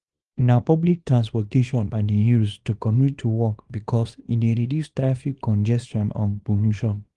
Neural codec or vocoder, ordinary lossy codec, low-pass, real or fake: codec, 24 kHz, 0.9 kbps, WavTokenizer, small release; Opus, 24 kbps; 10.8 kHz; fake